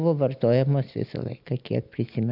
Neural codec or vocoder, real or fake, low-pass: none; real; 5.4 kHz